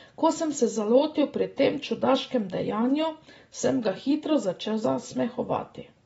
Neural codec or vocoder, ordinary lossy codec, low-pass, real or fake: none; AAC, 24 kbps; 19.8 kHz; real